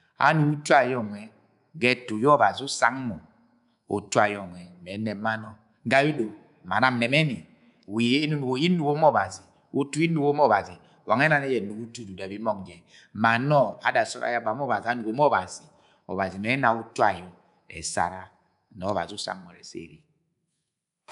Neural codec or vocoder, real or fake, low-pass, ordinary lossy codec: codec, 24 kHz, 3.1 kbps, DualCodec; fake; 10.8 kHz; none